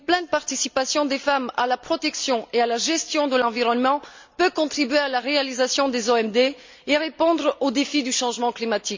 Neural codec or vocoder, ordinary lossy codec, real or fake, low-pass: none; MP3, 64 kbps; real; 7.2 kHz